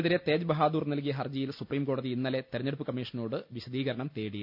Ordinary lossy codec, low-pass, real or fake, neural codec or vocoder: none; 5.4 kHz; real; none